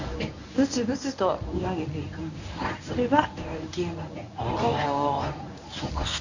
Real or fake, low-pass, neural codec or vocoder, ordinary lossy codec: fake; 7.2 kHz; codec, 24 kHz, 0.9 kbps, WavTokenizer, medium speech release version 1; none